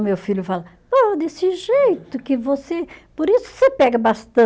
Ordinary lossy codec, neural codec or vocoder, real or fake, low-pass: none; none; real; none